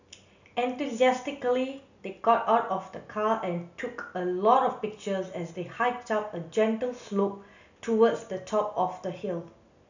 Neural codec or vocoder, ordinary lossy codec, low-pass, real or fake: none; none; 7.2 kHz; real